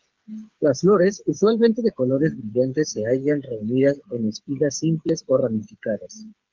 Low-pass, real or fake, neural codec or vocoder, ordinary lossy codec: 7.2 kHz; fake; codec, 16 kHz, 8 kbps, FreqCodec, smaller model; Opus, 32 kbps